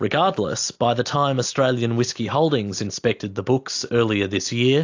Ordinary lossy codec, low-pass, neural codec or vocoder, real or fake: AAC, 48 kbps; 7.2 kHz; none; real